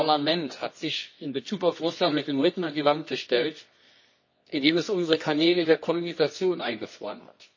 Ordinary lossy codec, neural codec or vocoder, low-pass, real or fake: MP3, 32 kbps; codec, 24 kHz, 0.9 kbps, WavTokenizer, medium music audio release; 7.2 kHz; fake